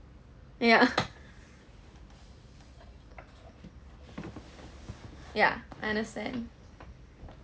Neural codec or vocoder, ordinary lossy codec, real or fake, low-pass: none; none; real; none